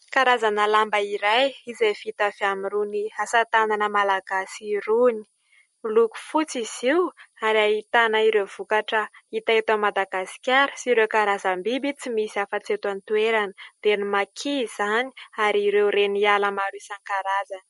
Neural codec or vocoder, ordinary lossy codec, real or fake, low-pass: none; MP3, 48 kbps; real; 19.8 kHz